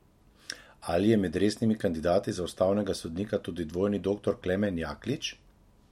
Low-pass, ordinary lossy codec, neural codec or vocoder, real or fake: 19.8 kHz; MP3, 64 kbps; none; real